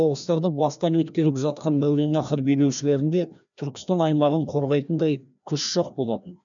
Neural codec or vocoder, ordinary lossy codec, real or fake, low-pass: codec, 16 kHz, 1 kbps, FreqCodec, larger model; none; fake; 7.2 kHz